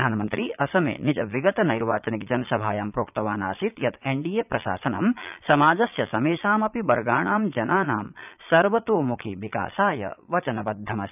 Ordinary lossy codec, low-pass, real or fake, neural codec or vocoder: none; 3.6 kHz; fake; vocoder, 22.05 kHz, 80 mel bands, Vocos